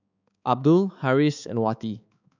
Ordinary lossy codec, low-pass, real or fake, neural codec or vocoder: none; 7.2 kHz; fake; codec, 16 kHz, 4 kbps, X-Codec, HuBERT features, trained on balanced general audio